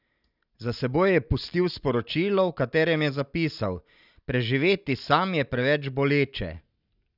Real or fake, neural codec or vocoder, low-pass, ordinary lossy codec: real; none; 5.4 kHz; none